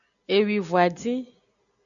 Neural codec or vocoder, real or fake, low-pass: none; real; 7.2 kHz